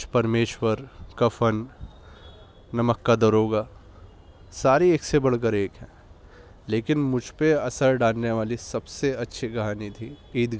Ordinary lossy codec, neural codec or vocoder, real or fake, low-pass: none; none; real; none